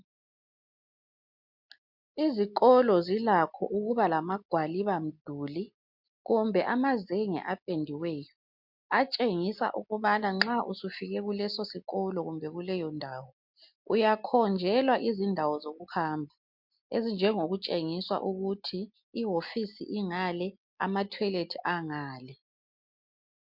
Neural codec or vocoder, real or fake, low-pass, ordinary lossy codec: none; real; 5.4 kHz; MP3, 48 kbps